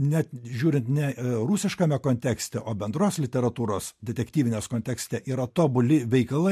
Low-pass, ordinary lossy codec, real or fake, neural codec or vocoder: 14.4 kHz; MP3, 64 kbps; real; none